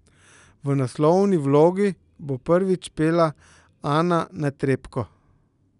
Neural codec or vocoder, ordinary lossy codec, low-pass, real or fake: none; none; 10.8 kHz; real